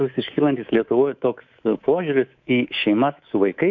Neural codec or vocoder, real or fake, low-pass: codec, 24 kHz, 3.1 kbps, DualCodec; fake; 7.2 kHz